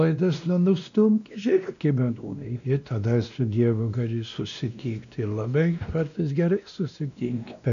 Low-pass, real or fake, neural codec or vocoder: 7.2 kHz; fake; codec, 16 kHz, 1 kbps, X-Codec, WavLM features, trained on Multilingual LibriSpeech